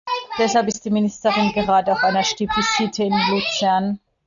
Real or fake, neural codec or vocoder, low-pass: real; none; 7.2 kHz